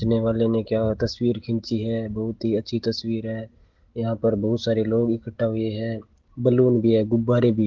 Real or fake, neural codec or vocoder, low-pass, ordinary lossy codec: real; none; 7.2 kHz; Opus, 16 kbps